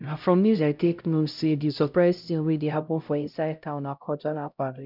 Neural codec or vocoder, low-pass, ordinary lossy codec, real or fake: codec, 16 kHz, 0.5 kbps, X-Codec, HuBERT features, trained on LibriSpeech; 5.4 kHz; none; fake